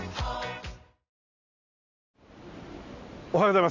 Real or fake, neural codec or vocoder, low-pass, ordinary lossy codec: real; none; 7.2 kHz; none